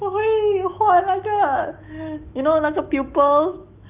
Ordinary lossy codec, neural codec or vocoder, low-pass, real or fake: Opus, 24 kbps; autoencoder, 48 kHz, 128 numbers a frame, DAC-VAE, trained on Japanese speech; 3.6 kHz; fake